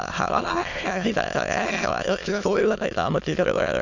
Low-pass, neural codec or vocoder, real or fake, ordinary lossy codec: 7.2 kHz; autoencoder, 22.05 kHz, a latent of 192 numbers a frame, VITS, trained on many speakers; fake; none